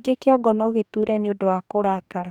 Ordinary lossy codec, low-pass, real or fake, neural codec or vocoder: none; 19.8 kHz; fake; codec, 44.1 kHz, 2.6 kbps, DAC